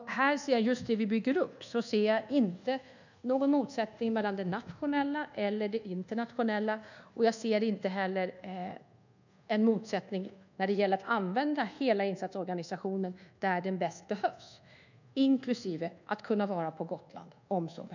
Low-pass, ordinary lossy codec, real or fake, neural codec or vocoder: 7.2 kHz; none; fake; codec, 24 kHz, 1.2 kbps, DualCodec